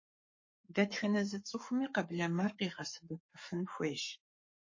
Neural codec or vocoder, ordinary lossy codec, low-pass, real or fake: codec, 44.1 kHz, 7.8 kbps, Pupu-Codec; MP3, 32 kbps; 7.2 kHz; fake